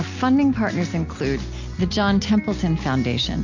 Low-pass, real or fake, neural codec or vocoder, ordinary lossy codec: 7.2 kHz; real; none; AAC, 48 kbps